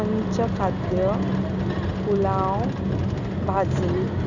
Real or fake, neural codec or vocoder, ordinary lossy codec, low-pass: real; none; none; 7.2 kHz